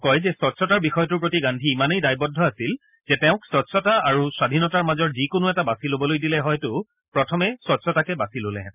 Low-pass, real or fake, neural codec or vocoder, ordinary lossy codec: 3.6 kHz; real; none; none